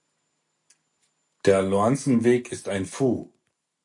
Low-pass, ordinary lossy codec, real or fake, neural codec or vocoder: 10.8 kHz; AAC, 64 kbps; real; none